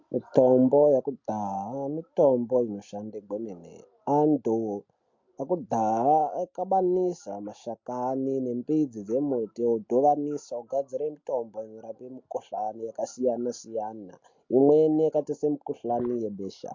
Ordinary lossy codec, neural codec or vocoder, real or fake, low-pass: MP3, 48 kbps; none; real; 7.2 kHz